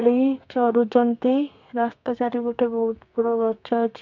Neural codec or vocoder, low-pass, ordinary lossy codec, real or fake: codec, 32 kHz, 1.9 kbps, SNAC; 7.2 kHz; none; fake